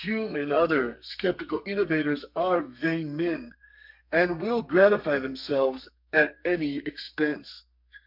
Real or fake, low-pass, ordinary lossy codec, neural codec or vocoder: fake; 5.4 kHz; MP3, 48 kbps; codec, 32 kHz, 1.9 kbps, SNAC